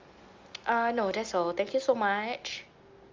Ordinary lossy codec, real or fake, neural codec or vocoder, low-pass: Opus, 32 kbps; real; none; 7.2 kHz